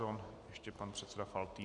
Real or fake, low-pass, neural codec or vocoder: real; 10.8 kHz; none